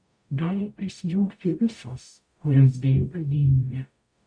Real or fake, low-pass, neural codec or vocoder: fake; 9.9 kHz; codec, 44.1 kHz, 0.9 kbps, DAC